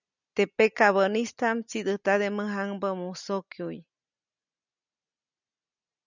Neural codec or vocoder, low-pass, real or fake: none; 7.2 kHz; real